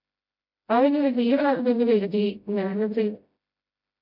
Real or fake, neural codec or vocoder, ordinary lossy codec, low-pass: fake; codec, 16 kHz, 0.5 kbps, FreqCodec, smaller model; MP3, 48 kbps; 5.4 kHz